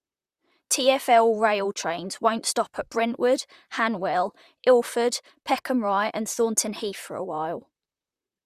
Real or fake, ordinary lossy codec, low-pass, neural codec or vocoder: fake; Opus, 64 kbps; 14.4 kHz; vocoder, 44.1 kHz, 128 mel bands, Pupu-Vocoder